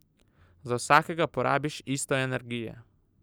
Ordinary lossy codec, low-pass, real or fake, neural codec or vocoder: none; none; real; none